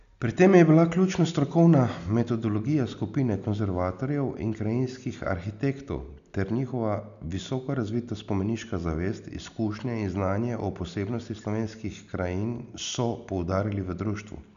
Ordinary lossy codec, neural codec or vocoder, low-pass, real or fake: none; none; 7.2 kHz; real